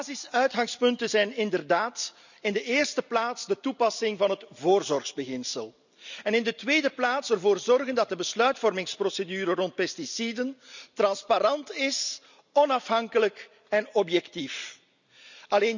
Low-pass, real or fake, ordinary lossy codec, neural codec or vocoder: 7.2 kHz; real; none; none